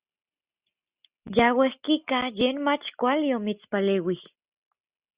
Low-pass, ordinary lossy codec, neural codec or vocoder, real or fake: 3.6 kHz; Opus, 64 kbps; none; real